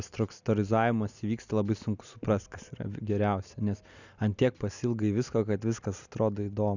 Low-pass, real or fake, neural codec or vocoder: 7.2 kHz; real; none